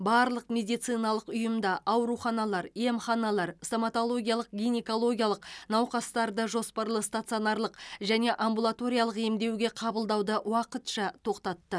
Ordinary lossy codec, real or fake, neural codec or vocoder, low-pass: none; real; none; none